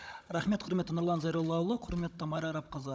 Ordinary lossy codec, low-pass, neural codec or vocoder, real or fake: none; none; codec, 16 kHz, 16 kbps, FunCodec, trained on Chinese and English, 50 frames a second; fake